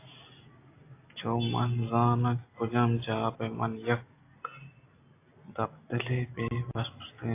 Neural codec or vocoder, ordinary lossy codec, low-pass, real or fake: none; AAC, 24 kbps; 3.6 kHz; real